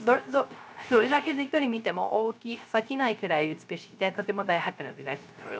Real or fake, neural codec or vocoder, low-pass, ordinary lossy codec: fake; codec, 16 kHz, 0.3 kbps, FocalCodec; none; none